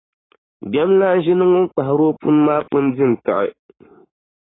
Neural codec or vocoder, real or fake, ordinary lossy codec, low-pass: codec, 44.1 kHz, 7.8 kbps, Pupu-Codec; fake; AAC, 16 kbps; 7.2 kHz